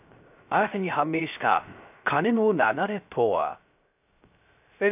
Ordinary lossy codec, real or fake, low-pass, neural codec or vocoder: none; fake; 3.6 kHz; codec, 16 kHz, 0.3 kbps, FocalCodec